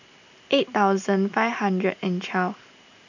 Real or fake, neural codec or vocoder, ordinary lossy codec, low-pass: real; none; none; 7.2 kHz